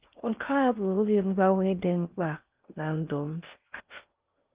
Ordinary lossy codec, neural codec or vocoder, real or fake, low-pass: Opus, 32 kbps; codec, 16 kHz in and 24 kHz out, 0.6 kbps, FocalCodec, streaming, 4096 codes; fake; 3.6 kHz